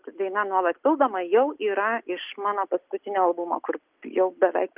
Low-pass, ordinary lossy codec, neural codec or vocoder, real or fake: 3.6 kHz; Opus, 24 kbps; none; real